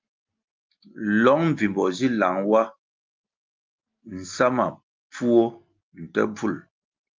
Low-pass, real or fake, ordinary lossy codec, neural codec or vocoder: 7.2 kHz; real; Opus, 24 kbps; none